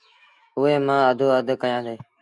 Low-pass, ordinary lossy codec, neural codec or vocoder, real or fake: 10.8 kHz; Opus, 64 kbps; autoencoder, 48 kHz, 128 numbers a frame, DAC-VAE, trained on Japanese speech; fake